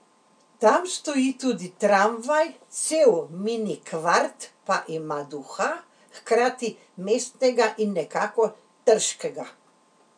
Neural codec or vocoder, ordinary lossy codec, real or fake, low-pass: none; none; real; 9.9 kHz